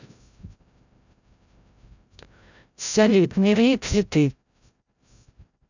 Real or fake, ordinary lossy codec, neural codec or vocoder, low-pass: fake; none; codec, 16 kHz, 0.5 kbps, FreqCodec, larger model; 7.2 kHz